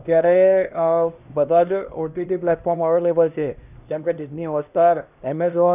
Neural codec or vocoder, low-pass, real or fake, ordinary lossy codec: codec, 16 kHz, 1 kbps, X-Codec, HuBERT features, trained on LibriSpeech; 3.6 kHz; fake; none